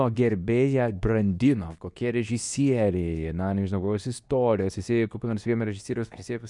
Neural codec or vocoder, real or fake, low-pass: codec, 24 kHz, 0.9 kbps, WavTokenizer, medium speech release version 2; fake; 10.8 kHz